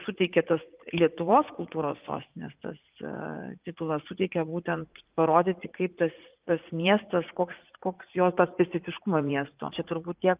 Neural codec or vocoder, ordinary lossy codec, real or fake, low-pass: vocoder, 44.1 kHz, 80 mel bands, Vocos; Opus, 32 kbps; fake; 3.6 kHz